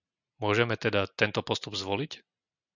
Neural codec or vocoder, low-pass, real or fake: none; 7.2 kHz; real